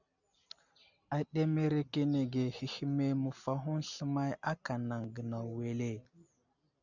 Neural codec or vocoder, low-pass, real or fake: none; 7.2 kHz; real